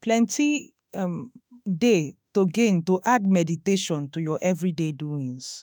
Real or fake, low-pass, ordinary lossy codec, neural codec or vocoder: fake; none; none; autoencoder, 48 kHz, 32 numbers a frame, DAC-VAE, trained on Japanese speech